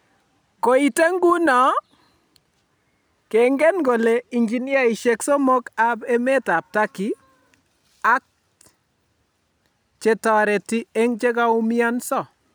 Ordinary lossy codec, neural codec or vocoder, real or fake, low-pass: none; none; real; none